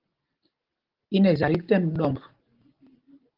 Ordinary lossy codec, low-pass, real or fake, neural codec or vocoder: Opus, 16 kbps; 5.4 kHz; real; none